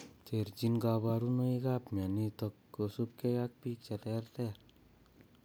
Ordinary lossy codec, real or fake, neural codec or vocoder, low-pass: none; real; none; none